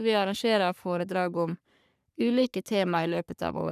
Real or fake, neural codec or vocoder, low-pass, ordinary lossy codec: fake; codec, 44.1 kHz, 3.4 kbps, Pupu-Codec; 14.4 kHz; none